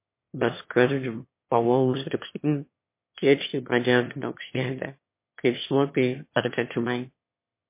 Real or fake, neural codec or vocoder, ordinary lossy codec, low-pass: fake; autoencoder, 22.05 kHz, a latent of 192 numbers a frame, VITS, trained on one speaker; MP3, 24 kbps; 3.6 kHz